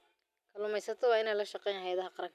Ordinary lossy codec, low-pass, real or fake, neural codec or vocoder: none; 14.4 kHz; real; none